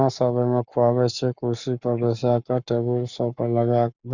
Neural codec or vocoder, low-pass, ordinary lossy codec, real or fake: codec, 44.1 kHz, 7.8 kbps, Pupu-Codec; 7.2 kHz; none; fake